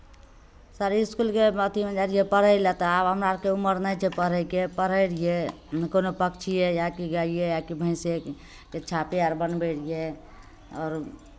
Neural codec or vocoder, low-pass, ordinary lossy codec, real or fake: none; none; none; real